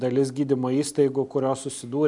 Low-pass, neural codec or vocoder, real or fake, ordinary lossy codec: 10.8 kHz; none; real; MP3, 96 kbps